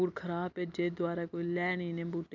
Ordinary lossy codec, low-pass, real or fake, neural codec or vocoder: none; 7.2 kHz; real; none